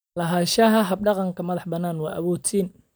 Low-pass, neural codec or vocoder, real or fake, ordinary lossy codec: none; none; real; none